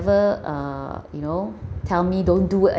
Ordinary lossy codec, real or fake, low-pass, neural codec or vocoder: none; real; none; none